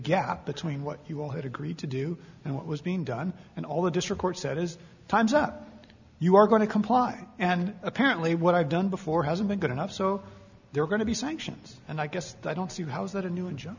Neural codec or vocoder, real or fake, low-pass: none; real; 7.2 kHz